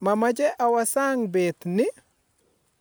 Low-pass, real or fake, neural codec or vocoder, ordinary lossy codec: none; real; none; none